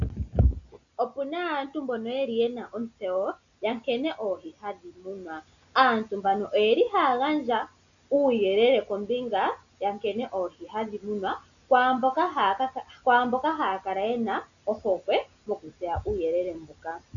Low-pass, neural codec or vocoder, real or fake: 7.2 kHz; none; real